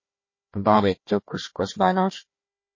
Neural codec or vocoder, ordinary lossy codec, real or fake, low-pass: codec, 16 kHz, 1 kbps, FunCodec, trained on Chinese and English, 50 frames a second; MP3, 32 kbps; fake; 7.2 kHz